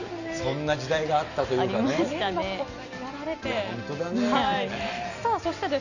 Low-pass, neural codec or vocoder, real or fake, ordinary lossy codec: 7.2 kHz; none; real; none